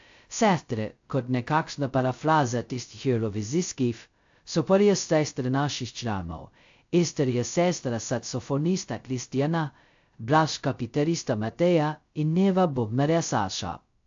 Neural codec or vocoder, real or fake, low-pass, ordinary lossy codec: codec, 16 kHz, 0.2 kbps, FocalCodec; fake; 7.2 kHz; AAC, 64 kbps